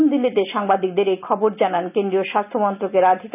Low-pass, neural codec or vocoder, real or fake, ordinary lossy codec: 3.6 kHz; none; real; none